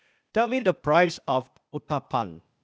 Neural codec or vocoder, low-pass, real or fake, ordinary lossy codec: codec, 16 kHz, 0.8 kbps, ZipCodec; none; fake; none